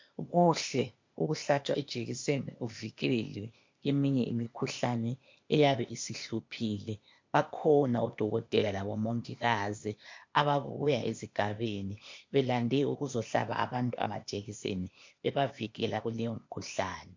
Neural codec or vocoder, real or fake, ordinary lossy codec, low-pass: codec, 16 kHz, 0.8 kbps, ZipCodec; fake; MP3, 48 kbps; 7.2 kHz